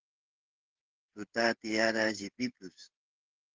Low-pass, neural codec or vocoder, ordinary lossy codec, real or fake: 7.2 kHz; codec, 16 kHz, 16 kbps, FreqCodec, smaller model; Opus, 16 kbps; fake